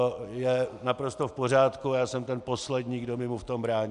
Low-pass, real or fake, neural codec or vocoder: 10.8 kHz; real; none